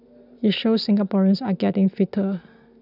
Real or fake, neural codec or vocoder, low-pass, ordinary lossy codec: real; none; 5.4 kHz; none